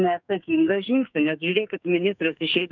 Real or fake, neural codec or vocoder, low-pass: fake; codec, 44.1 kHz, 2.6 kbps, SNAC; 7.2 kHz